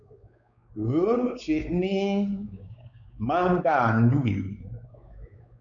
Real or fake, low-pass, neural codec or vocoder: fake; 7.2 kHz; codec, 16 kHz, 4 kbps, X-Codec, WavLM features, trained on Multilingual LibriSpeech